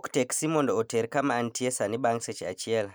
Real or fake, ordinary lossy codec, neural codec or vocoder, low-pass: real; none; none; none